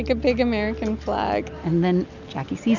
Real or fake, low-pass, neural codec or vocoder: real; 7.2 kHz; none